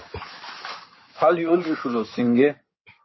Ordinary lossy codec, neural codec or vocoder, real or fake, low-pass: MP3, 24 kbps; codec, 16 kHz in and 24 kHz out, 2.2 kbps, FireRedTTS-2 codec; fake; 7.2 kHz